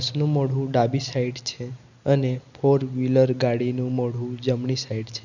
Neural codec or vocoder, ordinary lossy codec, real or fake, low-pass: none; none; real; 7.2 kHz